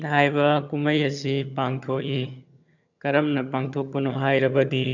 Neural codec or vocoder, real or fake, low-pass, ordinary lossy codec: vocoder, 22.05 kHz, 80 mel bands, HiFi-GAN; fake; 7.2 kHz; none